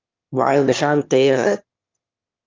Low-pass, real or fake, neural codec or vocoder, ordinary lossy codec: 7.2 kHz; fake; autoencoder, 22.05 kHz, a latent of 192 numbers a frame, VITS, trained on one speaker; Opus, 32 kbps